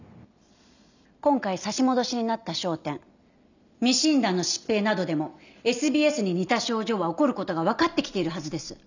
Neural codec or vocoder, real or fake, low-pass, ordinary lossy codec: none; real; 7.2 kHz; none